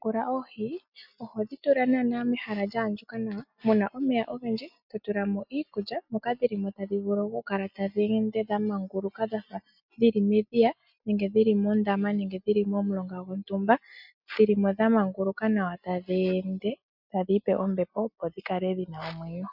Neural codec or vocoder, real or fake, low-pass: none; real; 5.4 kHz